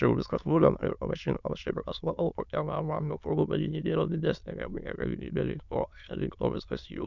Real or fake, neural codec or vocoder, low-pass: fake; autoencoder, 22.05 kHz, a latent of 192 numbers a frame, VITS, trained on many speakers; 7.2 kHz